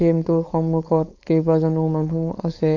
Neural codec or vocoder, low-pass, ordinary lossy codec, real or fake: codec, 16 kHz, 4.8 kbps, FACodec; 7.2 kHz; AAC, 48 kbps; fake